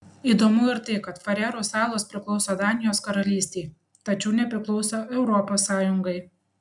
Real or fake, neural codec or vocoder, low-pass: real; none; 10.8 kHz